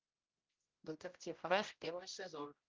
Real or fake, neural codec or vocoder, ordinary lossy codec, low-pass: fake; codec, 16 kHz, 0.5 kbps, X-Codec, HuBERT features, trained on general audio; Opus, 32 kbps; 7.2 kHz